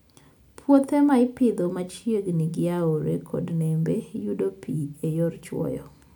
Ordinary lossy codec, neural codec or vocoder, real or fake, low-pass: none; none; real; 19.8 kHz